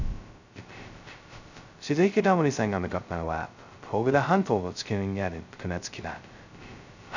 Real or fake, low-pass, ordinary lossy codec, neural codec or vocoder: fake; 7.2 kHz; none; codec, 16 kHz, 0.2 kbps, FocalCodec